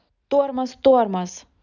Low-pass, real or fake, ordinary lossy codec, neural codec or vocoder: 7.2 kHz; real; none; none